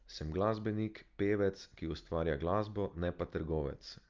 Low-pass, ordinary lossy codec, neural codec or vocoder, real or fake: 7.2 kHz; Opus, 24 kbps; none; real